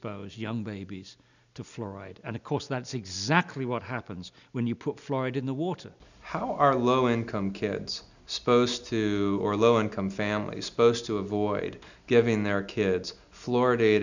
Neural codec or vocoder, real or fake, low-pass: none; real; 7.2 kHz